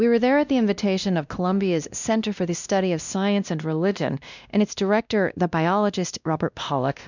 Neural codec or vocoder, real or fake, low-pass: codec, 16 kHz, 1 kbps, X-Codec, WavLM features, trained on Multilingual LibriSpeech; fake; 7.2 kHz